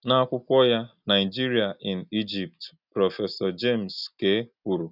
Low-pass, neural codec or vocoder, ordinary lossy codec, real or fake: 5.4 kHz; none; none; real